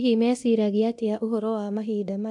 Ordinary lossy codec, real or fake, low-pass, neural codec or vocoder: AAC, 64 kbps; fake; 10.8 kHz; codec, 24 kHz, 0.9 kbps, DualCodec